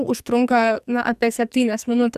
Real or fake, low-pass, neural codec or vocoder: fake; 14.4 kHz; codec, 44.1 kHz, 2.6 kbps, SNAC